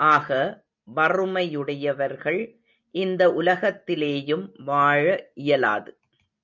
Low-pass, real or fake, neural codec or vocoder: 7.2 kHz; real; none